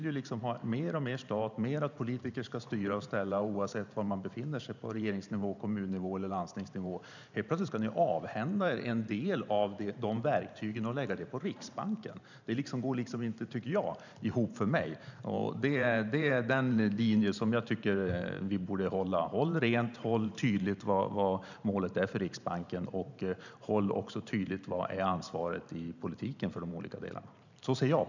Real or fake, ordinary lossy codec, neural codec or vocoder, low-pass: fake; none; vocoder, 44.1 kHz, 128 mel bands every 512 samples, BigVGAN v2; 7.2 kHz